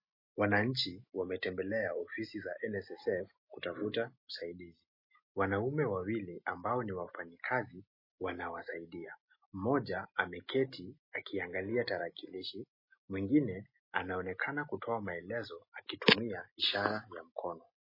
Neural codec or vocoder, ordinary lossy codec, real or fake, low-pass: none; MP3, 24 kbps; real; 5.4 kHz